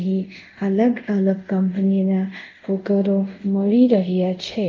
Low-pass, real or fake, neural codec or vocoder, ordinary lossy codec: 7.2 kHz; fake; codec, 24 kHz, 0.5 kbps, DualCodec; Opus, 24 kbps